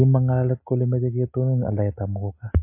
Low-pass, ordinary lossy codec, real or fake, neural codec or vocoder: 3.6 kHz; MP3, 24 kbps; real; none